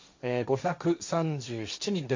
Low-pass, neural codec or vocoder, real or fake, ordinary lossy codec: 7.2 kHz; codec, 16 kHz, 1.1 kbps, Voila-Tokenizer; fake; MP3, 64 kbps